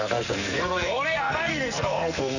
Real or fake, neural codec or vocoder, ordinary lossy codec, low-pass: fake; codec, 44.1 kHz, 2.6 kbps, SNAC; none; 7.2 kHz